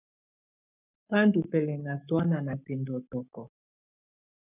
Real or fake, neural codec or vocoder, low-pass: fake; codec, 44.1 kHz, 7.8 kbps, Pupu-Codec; 3.6 kHz